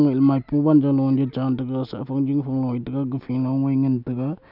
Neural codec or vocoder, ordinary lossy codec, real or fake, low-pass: none; none; real; 5.4 kHz